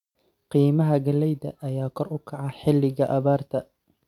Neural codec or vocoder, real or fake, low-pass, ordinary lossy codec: none; real; 19.8 kHz; none